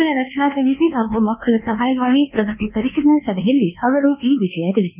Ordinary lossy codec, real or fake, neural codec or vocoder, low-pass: none; fake; codec, 24 kHz, 1.2 kbps, DualCodec; 3.6 kHz